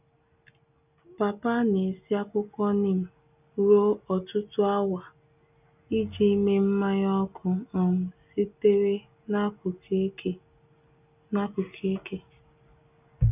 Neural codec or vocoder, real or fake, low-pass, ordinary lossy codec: none; real; 3.6 kHz; none